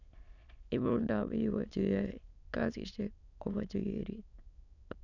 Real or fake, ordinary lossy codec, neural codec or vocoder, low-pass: fake; none; autoencoder, 22.05 kHz, a latent of 192 numbers a frame, VITS, trained on many speakers; 7.2 kHz